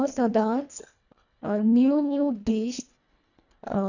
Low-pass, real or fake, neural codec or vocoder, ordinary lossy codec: 7.2 kHz; fake; codec, 24 kHz, 1.5 kbps, HILCodec; none